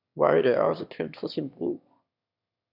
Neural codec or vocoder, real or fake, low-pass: autoencoder, 22.05 kHz, a latent of 192 numbers a frame, VITS, trained on one speaker; fake; 5.4 kHz